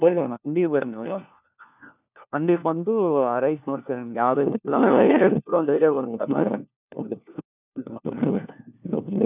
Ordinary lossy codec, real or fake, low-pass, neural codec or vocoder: none; fake; 3.6 kHz; codec, 16 kHz, 1 kbps, FunCodec, trained on LibriTTS, 50 frames a second